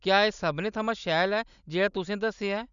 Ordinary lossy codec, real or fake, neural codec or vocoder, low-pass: none; real; none; 7.2 kHz